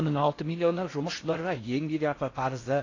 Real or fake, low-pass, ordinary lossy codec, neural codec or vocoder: fake; 7.2 kHz; AAC, 32 kbps; codec, 16 kHz in and 24 kHz out, 0.6 kbps, FocalCodec, streaming, 4096 codes